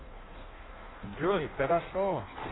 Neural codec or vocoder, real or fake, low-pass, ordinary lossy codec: codec, 16 kHz in and 24 kHz out, 1.1 kbps, FireRedTTS-2 codec; fake; 7.2 kHz; AAC, 16 kbps